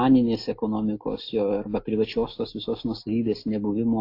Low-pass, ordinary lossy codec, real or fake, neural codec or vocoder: 5.4 kHz; AAC, 32 kbps; real; none